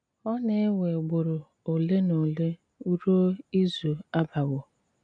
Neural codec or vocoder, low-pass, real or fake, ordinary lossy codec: none; 9.9 kHz; real; none